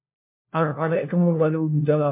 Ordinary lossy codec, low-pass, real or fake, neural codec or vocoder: MP3, 32 kbps; 3.6 kHz; fake; codec, 16 kHz, 1 kbps, FunCodec, trained on LibriTTS, 50 frames a second